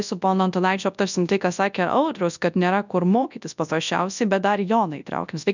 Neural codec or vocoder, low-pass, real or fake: codec, 24 kHz, 0.9 kbps, WavTokenizer, large speech release; 7.2 kHz; fake